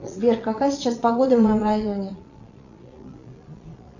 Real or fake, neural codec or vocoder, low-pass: fake; vocoder, 22.05 kHz, 80 mel bands, Vocos; 7.2 kHz